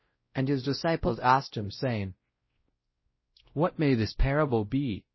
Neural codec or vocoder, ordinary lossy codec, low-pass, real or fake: codec, 16 kHz, 0.5 kbps, X-Codec, WavLM features, trained on Multilingual LibriSpeech; MP3, 24 kbps; 7.2 kHz; fake